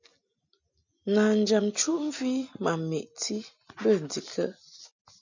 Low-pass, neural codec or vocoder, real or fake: 7.2 kHz; none; real